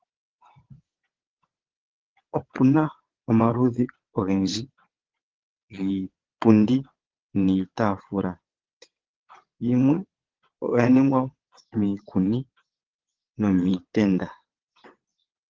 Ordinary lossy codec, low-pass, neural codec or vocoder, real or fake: Opus, 16 kbps; 7.2 kHz; vocoder, 22.05 kHz, 80 mel bands, WaveNeXt; fake